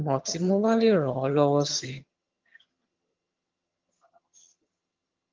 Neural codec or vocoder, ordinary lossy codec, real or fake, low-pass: vocoder, 22.05 kHz, 80 mel bands, HiFi-GAN; Opus, 32 kbps; fake; 7.2 kHz